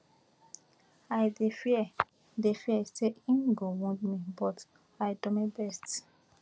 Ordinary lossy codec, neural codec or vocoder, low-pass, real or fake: none; none; none; real